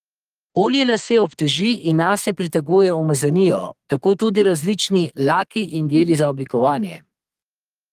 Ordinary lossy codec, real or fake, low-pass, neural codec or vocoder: Opus, 24 kbps; fake; 14.4 kHz; codec, 32 kHz, 1.9 kbps, SNAC